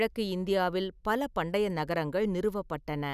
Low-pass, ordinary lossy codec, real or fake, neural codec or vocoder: 14.4 kHz; none; real; none